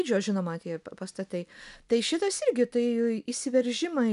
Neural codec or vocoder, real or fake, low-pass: none; real; 10.8 kHz